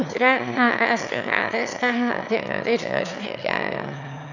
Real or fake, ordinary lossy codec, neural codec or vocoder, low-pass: fake; none; autoencoder, 22.05 kHz, a latent of 192 numbers a frame, VITS, trained on one speaker; 7.2 kHz